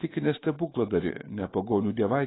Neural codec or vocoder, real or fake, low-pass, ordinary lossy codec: autoencoder, 48 kHz, 128 numbers a frame, DAC-VAE, trained on Japanese speech; fake; 7.2 kHz; AAC, 16 kbps